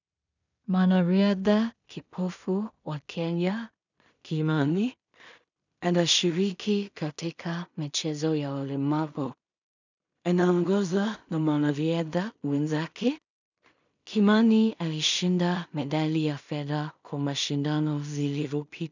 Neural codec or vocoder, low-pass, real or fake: codec, 16 kHz in and 24 kHz out, 0.4 kbps, LongCat-Audio-Codec, two codebook decoder; 7.2 kHz; fake